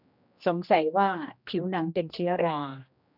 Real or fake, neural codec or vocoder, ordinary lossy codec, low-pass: fake; codec, 16 kHz, 1 kbps, X-Codec, HuBERT features, trained on general audio; none; 5.4 kHz